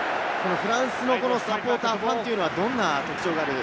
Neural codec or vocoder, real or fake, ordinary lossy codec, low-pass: none; real; none; none